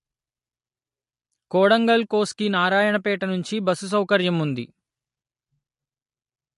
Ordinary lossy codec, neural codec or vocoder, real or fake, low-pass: MP3, 48 kbps; none; real; 14.4 kHz